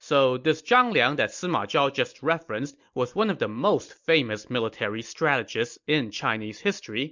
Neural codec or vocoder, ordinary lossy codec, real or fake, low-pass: none; MP3, 64 kbps; real; 7.2 kHz